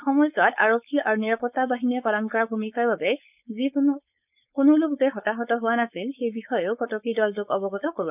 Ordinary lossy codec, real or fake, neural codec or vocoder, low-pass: none; fake; codec, 16 kHz, 4.8 kbps, FACodec; 3.6 kHz